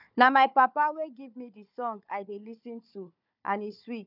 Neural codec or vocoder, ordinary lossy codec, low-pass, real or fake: codec, 16 kHz, 4 kbps, FunCodec, trained on Chinese and English, 50 frames a second; none; 5.4 kHz; fake